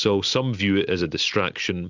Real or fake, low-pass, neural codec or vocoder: real; 7.2 kHz; none